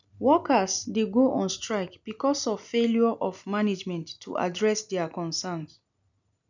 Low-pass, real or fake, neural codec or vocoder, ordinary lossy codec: 7.2 kHz; real; none; none